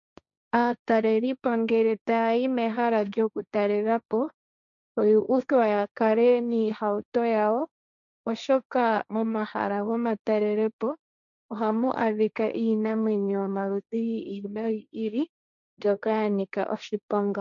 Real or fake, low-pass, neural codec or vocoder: fake; 7.2 kHz; codec, 16 kHz, 1.1 kbps, Voila-Tokenizer